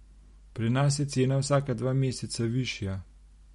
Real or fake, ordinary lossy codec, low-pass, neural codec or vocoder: real; MP3, 48 kbps; 19.8 kHz; none